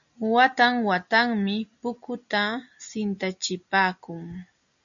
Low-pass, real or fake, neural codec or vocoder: 7.2 kHz; real; none